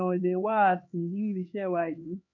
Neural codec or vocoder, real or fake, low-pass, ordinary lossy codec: codec, 16 kHz, 2 kbps, X-Codec, HuBERT features, trained on LibriSpeech; fake; 7.2 kHz; MP3, 48 kbps